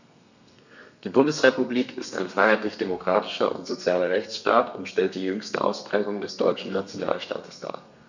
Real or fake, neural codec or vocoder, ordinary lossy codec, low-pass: fake; codec, 32 kHz, 1.9 kbps, SNAC; none; 7.2 kHz